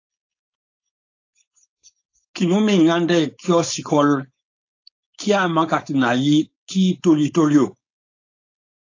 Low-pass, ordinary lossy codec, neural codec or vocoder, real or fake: 7.2 kHz; AAC, 48 kbps; codec, 16 kHz, 4.8 kbps, FACodec; fake